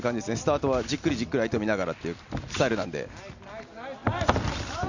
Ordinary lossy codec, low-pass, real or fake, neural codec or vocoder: none; 7.2 kHz; real; none